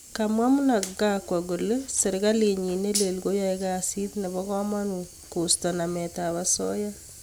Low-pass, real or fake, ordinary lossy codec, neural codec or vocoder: none; real; none; none